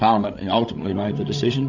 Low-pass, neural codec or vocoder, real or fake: 7.2 kHz; codec, 16 kHz, 16 kbps, FreqCodec, larger model; fake